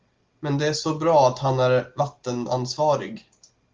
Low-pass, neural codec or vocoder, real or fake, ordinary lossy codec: 7.2 kHz; none; real; Opus, 24 kbps